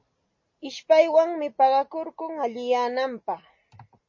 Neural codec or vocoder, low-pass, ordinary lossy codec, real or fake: none; 7.2 kHz; MP3, 32 kbps; real